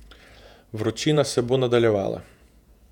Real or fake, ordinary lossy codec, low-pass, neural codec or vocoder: fake; none; 19.8 kHz; vocoder, 48 kHz, 128 mel bands, Vocos